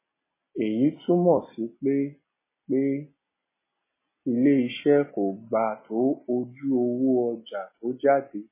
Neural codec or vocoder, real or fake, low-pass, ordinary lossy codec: none; real; 3.6 kHz; MP3, 16 kbps